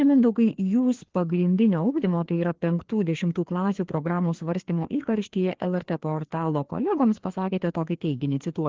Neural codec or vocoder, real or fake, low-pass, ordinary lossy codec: codec, 16 kHz, 2 kbps, FreqCodec, larger model; fake; 7.2 kHz; Opus, 16 kbps